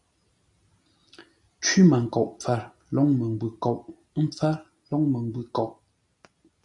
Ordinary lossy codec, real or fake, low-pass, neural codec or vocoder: MP3, 96 kbps; real; 10.8 kHz; none